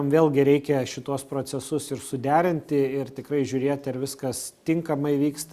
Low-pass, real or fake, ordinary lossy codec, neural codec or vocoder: 14.4 kHz; real; Opus, 64 kbps; none